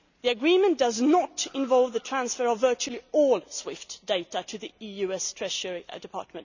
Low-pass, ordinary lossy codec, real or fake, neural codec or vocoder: 7.2 kHz; none; real; none